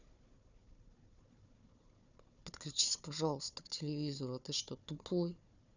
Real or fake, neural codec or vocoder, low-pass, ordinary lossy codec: fake; codec, 16 kHz, 4 kbps, FunCodec, trained on Chinese and English, 50 frames a second; 7.2 kHz; none